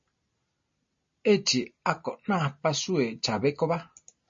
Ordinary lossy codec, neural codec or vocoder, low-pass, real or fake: MP3, 32 kbps; none; 7.2 kHz; real